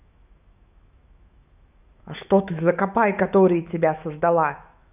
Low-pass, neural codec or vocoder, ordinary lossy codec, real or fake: 3.6 kHz; autoencoder, 48 kHz, 128 numbers a frame, DAC-VAE, trained on Japanese speech; none; fake